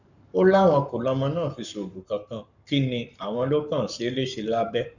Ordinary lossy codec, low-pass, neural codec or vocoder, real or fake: none; 7.2 kHz; codec, 44.1 kHz, 7.8 kbps, Pupu-Codec; fake